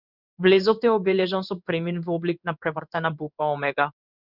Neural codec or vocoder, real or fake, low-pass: codec, 16 kHz in and 24 kHz out, 1 kbps, XY-Tokenizer; fake; 5.4 kHz